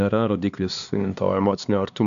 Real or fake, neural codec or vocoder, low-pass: fake; codec, 16 kHz, 2 kbps, X-Codec, HuBERT features, trained on LibriSpeech; 7.2 kHz